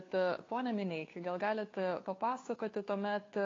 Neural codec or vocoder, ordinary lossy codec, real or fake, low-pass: codec, 16 kHz, 2 kbps, FunCodec, trained on Chinese and English, 25 frames a second; AAC, 32 kbps; fake; 7.2 kHz